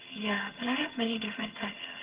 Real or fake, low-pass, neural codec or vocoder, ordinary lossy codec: fake; 3.6 kHz; vocoder, 22.05 kHz, 80 mel bands, HiFi-GAN; Opus, 16 kbps